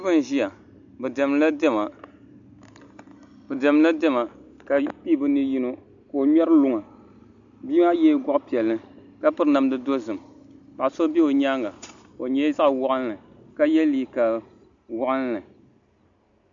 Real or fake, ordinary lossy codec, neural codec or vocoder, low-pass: real; AAC, 64 kbps; none; 7.2 kHz